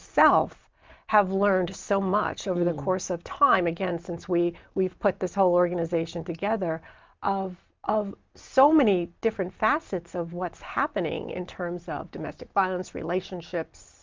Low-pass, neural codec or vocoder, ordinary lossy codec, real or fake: 7.2 kHz; none; Opus, 32 kbps; real